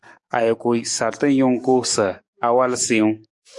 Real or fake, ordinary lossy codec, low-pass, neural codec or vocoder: fake; MP3, 96 kbps; 10.8 kHz; codec, 44.1 kHz, 7.8 kbps, DAC